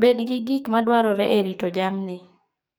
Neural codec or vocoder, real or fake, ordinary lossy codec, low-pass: codec, 44.1 kHz, 2.6 kbps, SNAC; fake; none; none